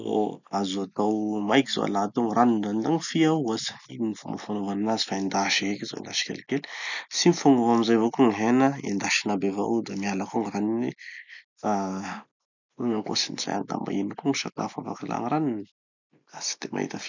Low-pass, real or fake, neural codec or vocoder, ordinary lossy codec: 7.2 kHz; real; none; none